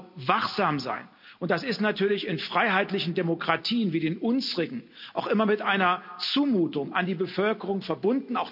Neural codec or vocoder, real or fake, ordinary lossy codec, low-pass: none; real; none; 5.4 kHz